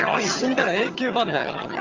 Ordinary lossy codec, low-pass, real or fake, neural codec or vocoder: Opus, 32 kbps; 7.2 kHz; fake; vocoder, 22.05 kHz, 80 mel bands, HiFi-GAN